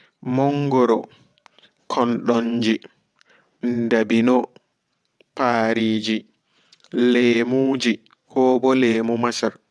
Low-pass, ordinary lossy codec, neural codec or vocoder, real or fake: none; none; vocoder, 22.05 kHz, 80 mel bands, WaveNeXt; fake